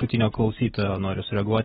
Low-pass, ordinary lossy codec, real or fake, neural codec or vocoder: 19.8 kHz; AAC, 16 kbps; real; none